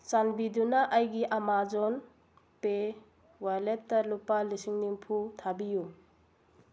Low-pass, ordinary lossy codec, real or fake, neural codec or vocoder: none; none; real; none